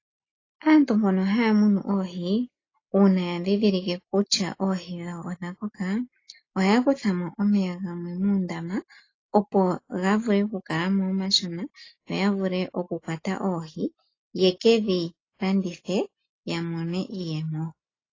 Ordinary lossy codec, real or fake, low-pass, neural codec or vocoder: AAC, 32 kbps; real; 7.2 kHz; none